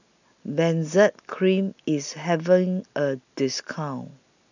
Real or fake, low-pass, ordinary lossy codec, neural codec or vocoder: real; 7.2 kHz; none; none